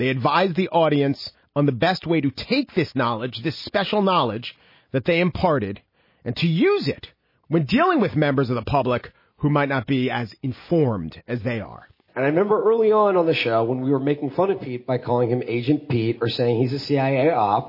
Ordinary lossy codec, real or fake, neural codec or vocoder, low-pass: MP3, 24 kbps; real; none; 5.4 kHz